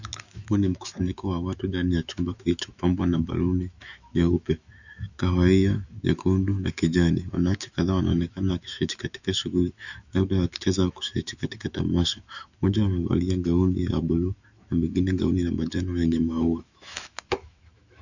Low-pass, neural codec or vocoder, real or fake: 7.2 kHz; autoencoder, 48 kHz, 128 numbers a frame, DAC-VAE, trained on Japanese speech; fake